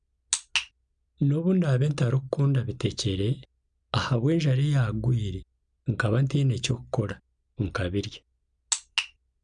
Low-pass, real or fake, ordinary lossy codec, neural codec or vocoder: 9.9 kHz; real; none; none